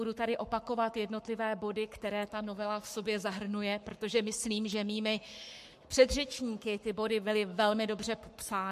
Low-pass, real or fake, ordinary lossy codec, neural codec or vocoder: 14.4 kHz; fake; MP3, 64 kbps; codec, 44.1 kHz, 7.8 kbps, Pupu-Codec